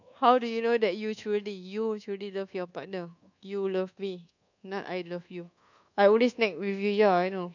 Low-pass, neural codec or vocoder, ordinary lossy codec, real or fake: 7.2 kHz; codec, 24 kHz, 1.2 kbps, DualCodec; none; fake